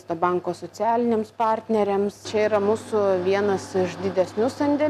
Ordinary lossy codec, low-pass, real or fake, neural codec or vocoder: AAC, 96 kbps; 14.4 kHz; real; none